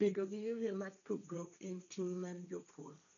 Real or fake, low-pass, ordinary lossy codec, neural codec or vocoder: fake; 7.2 kHz; none; codec, 16 kHz, 1.1 kbps, Voila-Tokenizer